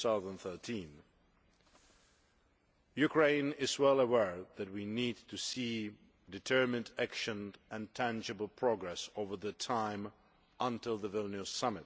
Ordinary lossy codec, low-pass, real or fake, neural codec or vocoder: none; none; real; none